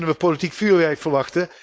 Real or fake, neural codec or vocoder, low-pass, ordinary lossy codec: fake; codec, 16 kHz, 4.8 kbps, FACodec; none; none